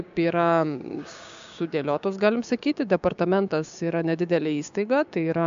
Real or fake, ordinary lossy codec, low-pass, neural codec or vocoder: fake; MP3, 64 kbps; 7.2 kHz; codec, 16 kHz, 6 kbps, DAC